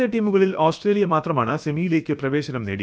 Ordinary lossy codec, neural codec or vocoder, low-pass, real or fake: none; codec, 16 kHz, about 1 kbps, DyCAST, with the encoder's durations; none; fake